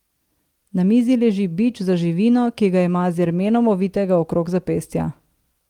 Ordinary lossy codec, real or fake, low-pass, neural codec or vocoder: Opus, 24 kbps; real; 19.8 kHz; none